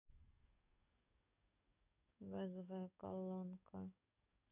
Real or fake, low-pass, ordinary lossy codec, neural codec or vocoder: fake; 3.6 kHz; none; codec, 44.1 kHz, 7.8 kbps, DAC